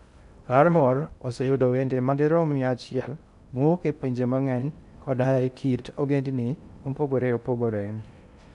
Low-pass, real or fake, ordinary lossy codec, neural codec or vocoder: 10.8 kHz; fake; none; codec, 16 kHz in and 24 kHz out, 0.6 kbps, FocalCodec, streaming, 2048 codes